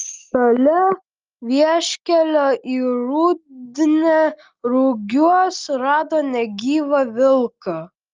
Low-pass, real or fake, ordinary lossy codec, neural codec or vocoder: 7.2 kHz; real; Opus, 24 kbps; none